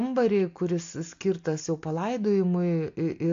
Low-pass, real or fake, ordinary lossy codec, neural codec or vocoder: 7.2 kHz; real; AAC, 48 kbps; none